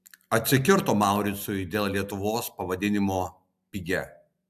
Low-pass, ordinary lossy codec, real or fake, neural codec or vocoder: 14.4 kHz; AAC, 96 kbps; real; none